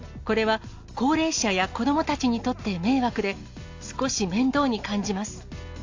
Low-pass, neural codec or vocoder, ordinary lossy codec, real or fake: 7.2 kHz; none; AAC, 48 kbps; real